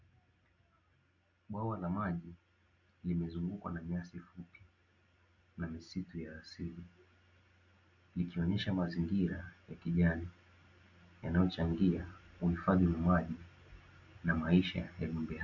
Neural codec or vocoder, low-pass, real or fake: none; 7.2 kHz; real